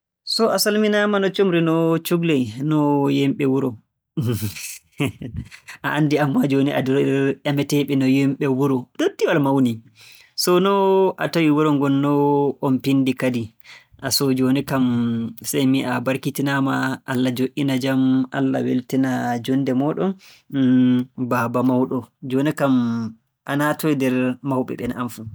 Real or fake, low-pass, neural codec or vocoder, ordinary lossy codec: real; none; none; none